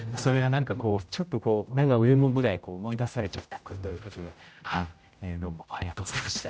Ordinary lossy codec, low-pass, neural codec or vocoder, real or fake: none; none; codec, 16 kHz, 0.5 kbps, X-Codec, HuBERT features, trained on general audio; fake